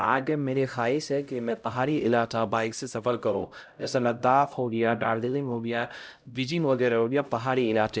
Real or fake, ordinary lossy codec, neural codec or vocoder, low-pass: fake; none; codec, 16 kHz, 0.5 kbps, X-Codec, HuBERT features, trained on LibriSpeech; none